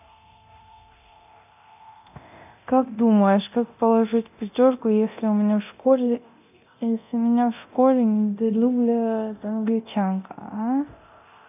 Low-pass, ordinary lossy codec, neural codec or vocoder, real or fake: 3.6 kHz; none; codec, 24 kHz, 0.9 kbps, DualCodec; fake